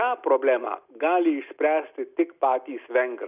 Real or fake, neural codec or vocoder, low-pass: real; none; 3.6 kHz